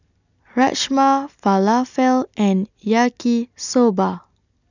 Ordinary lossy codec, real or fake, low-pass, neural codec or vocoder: none; real; 7.2 kHz; none